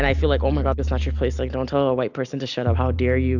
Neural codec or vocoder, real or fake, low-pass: none; real; 7.2 kHz